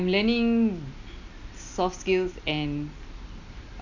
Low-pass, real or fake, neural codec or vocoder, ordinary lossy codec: 7.2 kHz; real; none; none